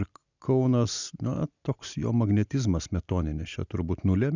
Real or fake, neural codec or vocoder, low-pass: real; none; 7.2 kHz